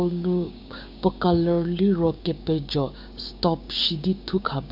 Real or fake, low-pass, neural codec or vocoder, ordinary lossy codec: fake; 5.4 kHz; codec, 16 kHz, 6 kbps, DAC; none